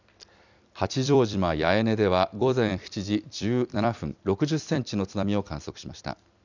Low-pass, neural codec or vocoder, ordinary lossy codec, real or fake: 7.2 kHz; vocoder, 44.1 kHz, 128 mel bands every 256 samples, BigVGAN v2; none; fake